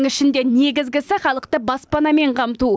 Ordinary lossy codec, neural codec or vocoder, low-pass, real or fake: none; none; none; real